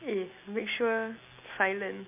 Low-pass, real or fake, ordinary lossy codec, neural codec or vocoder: 3.6 kHz; real; MP3, 32 kbps; none